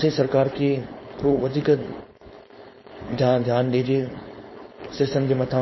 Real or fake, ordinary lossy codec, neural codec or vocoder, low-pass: fake; MP3, 24 kbps; codec, 16 kHz, 4.8 kbps, FACodec; 7.2 kHz